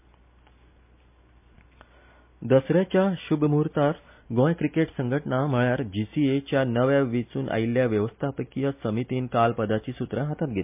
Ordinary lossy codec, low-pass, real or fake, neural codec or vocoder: MP3, 32 kbps; 3.6 kHz; real; none